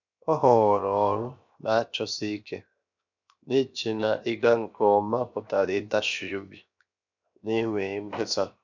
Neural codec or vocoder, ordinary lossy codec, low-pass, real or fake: codec, 16 kHz, 0.7 kbps, FocalCodec; none; 7.2 kHz; fake